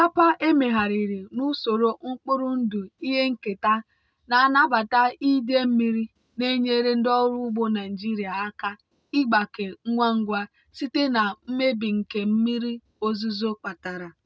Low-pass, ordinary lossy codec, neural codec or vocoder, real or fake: none; none; none; real